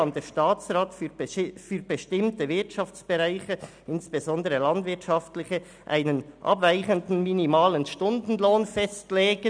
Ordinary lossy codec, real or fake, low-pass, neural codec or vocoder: none; real; 9.9 kHz; none